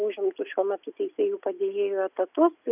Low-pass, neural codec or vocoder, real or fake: 3.6 kHz; none; real